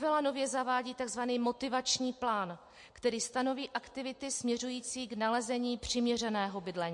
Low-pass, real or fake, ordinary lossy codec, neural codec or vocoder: 10.8 kHz; real; MP3, 48 kbps; none